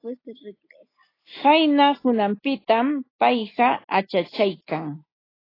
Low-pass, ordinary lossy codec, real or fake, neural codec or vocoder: 5.4 kHz; AAC, 24 kbps; real; none